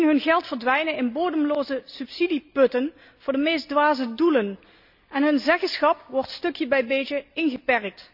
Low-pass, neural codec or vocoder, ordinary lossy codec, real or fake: 5.4 kHz; none; none; real